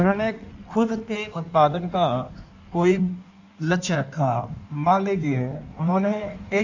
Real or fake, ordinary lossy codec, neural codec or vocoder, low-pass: fake; none; codec, 16 kHz in and 24 kHz out, 1.1 kbps, FireRedTTS-2 codec; 7.2 kHz